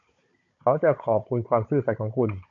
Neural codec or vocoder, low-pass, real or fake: codec, 16 kHz, 16 kbps, FunCodec, trained on Chinese and English, 50 frames a second; 7.2 kHz; fake